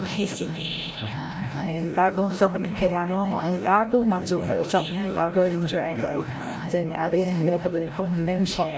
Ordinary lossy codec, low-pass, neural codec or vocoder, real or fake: none; none; codec, 16 kHz, 0.5 kbps, FreqCodec, larger model; fake